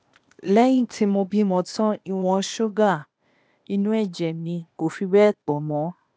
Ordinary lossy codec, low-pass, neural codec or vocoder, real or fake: none; none; codec, 16 kHz, 0.8 kbps, ZipCodec; fake